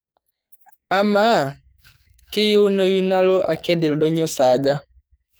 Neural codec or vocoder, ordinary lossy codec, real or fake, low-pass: codec, 44.1 kHz, 2.6 kbps, SNAC; none; fake; none